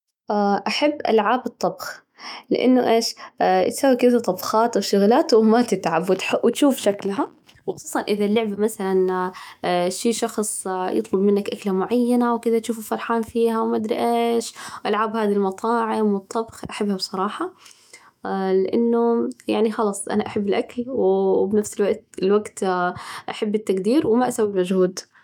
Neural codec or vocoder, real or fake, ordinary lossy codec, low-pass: autoencoder, 48 kHz, 128 numbers a frame, DAC-VAE, trained on Japanese speech; fake; none; 19.8 kHz